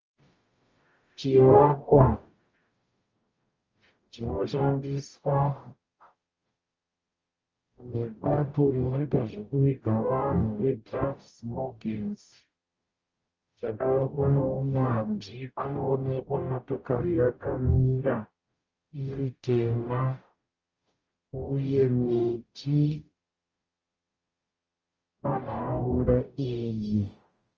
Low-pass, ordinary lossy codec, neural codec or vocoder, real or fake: 7.2 kHz; Opus, 24 kbps; codec, 44.1 kHz, 0.9 kbps, DAC; fake